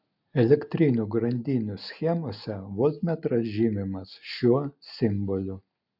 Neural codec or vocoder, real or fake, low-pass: none; real; 5.4 kHz